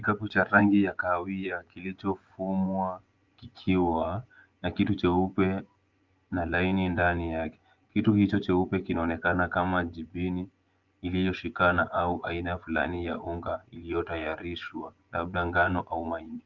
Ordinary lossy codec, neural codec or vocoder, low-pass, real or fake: Opus, 32 kbps; vocoder, 24 kHz, 100 mel bands, Vocos; 7.2 kHz; fake